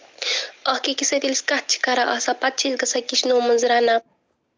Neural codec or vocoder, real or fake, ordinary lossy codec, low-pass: none; real; none; none